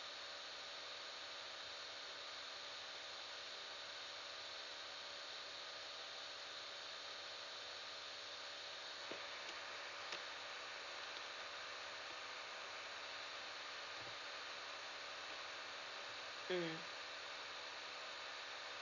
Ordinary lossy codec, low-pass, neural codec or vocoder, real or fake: none; 7.2 kHz; none; real